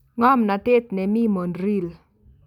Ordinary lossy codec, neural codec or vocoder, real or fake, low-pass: none; none; real; 19.8 kHz